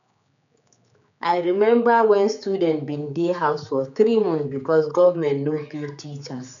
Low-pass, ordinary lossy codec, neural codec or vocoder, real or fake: 7.2 kHz; none; codec, 16 kHz, 4 kbps, X-Codec, HuBERT features, trained on general audio; fake